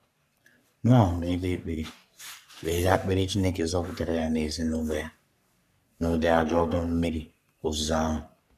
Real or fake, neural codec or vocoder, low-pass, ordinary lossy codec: fake; codec, 44.1 kHz, 3.4 kbps, Pupu-Codec; 14.4 kHz; none